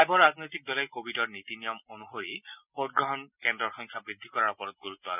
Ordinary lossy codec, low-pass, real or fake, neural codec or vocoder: none; 3.6 kHz; real; none